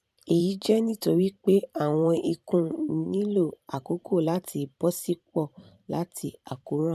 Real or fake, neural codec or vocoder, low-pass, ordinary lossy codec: fake; vocoder, 44.1 kHz, 128 mel bands every 512 samples, BigVGAN v2; 14.4 kHz; none